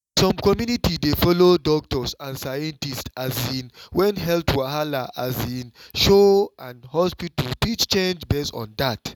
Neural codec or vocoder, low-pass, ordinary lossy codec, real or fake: none; 19.8 kHz; none; real